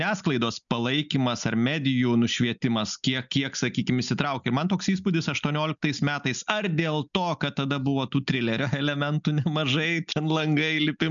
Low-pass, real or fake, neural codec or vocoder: 7.2 kHz; real; none